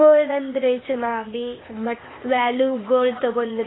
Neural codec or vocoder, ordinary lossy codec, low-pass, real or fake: codec, 16 kHz, 0.8 kbps, ZipCodec; AAC, 16 kbps; 7.2 kHz; fake